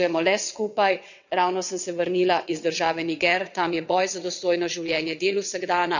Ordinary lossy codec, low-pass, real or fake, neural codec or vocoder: none; 7.2 kHz; fake; vocoder, 22.05 kHz, 80 mel bands, WaveNeXt